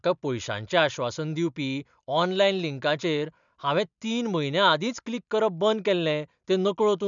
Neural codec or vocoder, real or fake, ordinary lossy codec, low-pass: none; real; none; 7.2 kHz